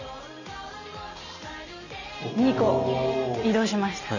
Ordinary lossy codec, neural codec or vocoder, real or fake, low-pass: none; none; real; 7.2 kHz